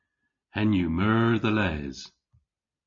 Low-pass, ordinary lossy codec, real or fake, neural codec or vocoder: 7.2 kHz; MP3, 32 kbps; real; none